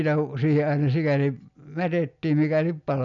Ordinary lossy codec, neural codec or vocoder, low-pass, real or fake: none; none; 7.2 kHz; real